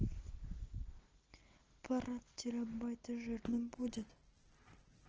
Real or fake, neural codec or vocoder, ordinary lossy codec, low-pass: real; none; Opus, 16 kbps; 7.2 kHz